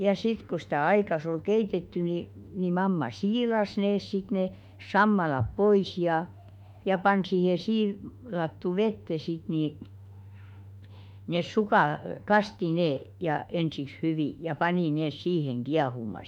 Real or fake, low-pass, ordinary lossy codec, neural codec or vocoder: fake; 19.8 kHz; none; autoencoder, 48 kHz, 32 numbers a frame, DAC-VAE, trained on Japanese speech